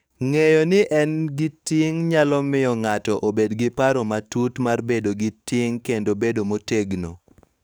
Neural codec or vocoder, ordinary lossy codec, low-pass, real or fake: codec, 44.1 kHz, 7.8 kbps, DAC; none; none; fake